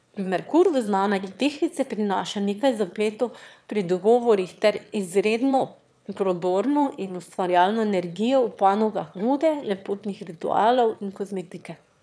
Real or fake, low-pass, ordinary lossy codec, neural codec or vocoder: fake; none; none; autoencoder, 22.05 kHz, a latent of 192 numbers a frame, VITS, trained on one speaker